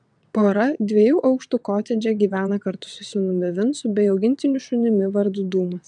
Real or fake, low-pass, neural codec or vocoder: fake; 9.9 kHz; vocoder, 22.05 kHz, 80 mel bands, WaveNeXt